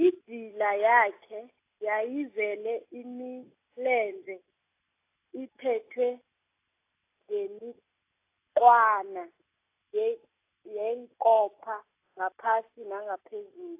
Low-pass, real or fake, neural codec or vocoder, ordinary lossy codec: 3.6 kHz; real; none; AAC, 24 kbps